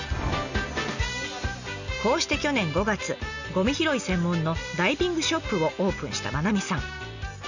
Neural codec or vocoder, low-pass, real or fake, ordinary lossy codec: none; 7.2 kHz; real; none